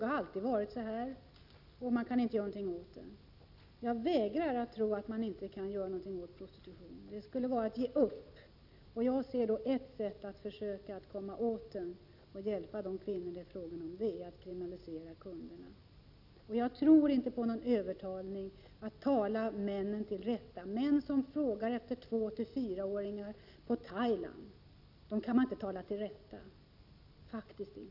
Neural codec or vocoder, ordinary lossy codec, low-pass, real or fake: none; none; 5.4 kHz; real